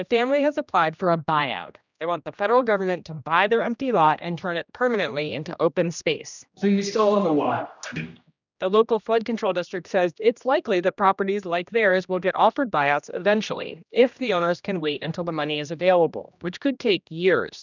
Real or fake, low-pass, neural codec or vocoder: fake; 7.2 kHz; codec, 16 kHz, 1 kbps, X-Codec, HuBERT features, trained on general audio